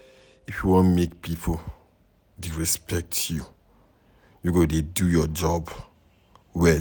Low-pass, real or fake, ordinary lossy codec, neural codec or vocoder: none; real; none; none